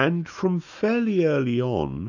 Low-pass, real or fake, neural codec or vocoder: 7.2 kHz; fake; autoencoder, 48 kHz, 128 numbers a frame, DAC-VAE, trained on Japanese speech